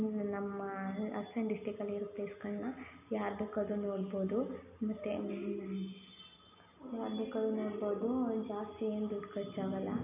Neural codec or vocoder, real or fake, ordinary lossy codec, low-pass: none; real; none; 3.6 kHz